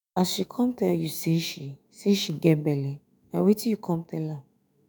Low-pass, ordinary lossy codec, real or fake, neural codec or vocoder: none; none; fake; autoencoder, 48 kHz, 128 numbers a frame, DAC-VAE, trained on Japanese speech